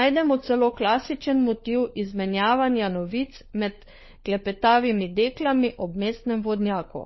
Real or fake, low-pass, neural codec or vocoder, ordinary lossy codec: fake; 7.2 kHz; codec, 16 kHz, 4 kbps, FunCodec, trained on LibriTTS, 50 frames a second; MP3, 24 kbps